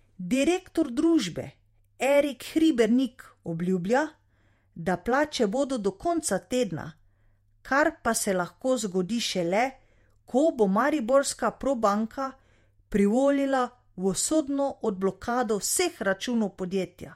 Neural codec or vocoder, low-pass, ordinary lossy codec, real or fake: vocoder, 48 kHz, 128 mel bands, Vocos; 19.8 kHz; MP3, 64 kbps; fake